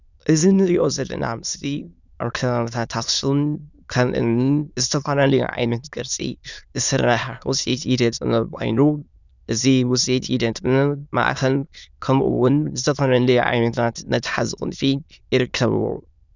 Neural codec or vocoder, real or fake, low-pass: autoencoder, 22.05 kHz, a latent of 192 numbers a frame, VITS, trained on many speakers; fake; 7.2 kHz